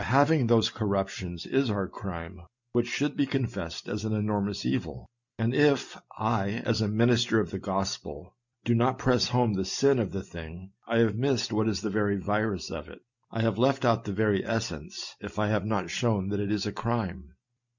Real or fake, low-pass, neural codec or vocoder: real; 7.2 kHz; none